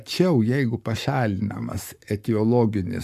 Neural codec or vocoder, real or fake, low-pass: codec, 44.1 kHz, 7.8 kbps, Pupu-Codec; fake; 14.4 kHz